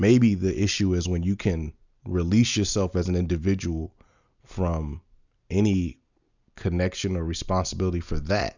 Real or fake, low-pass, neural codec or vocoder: real; 7.2 kHz; none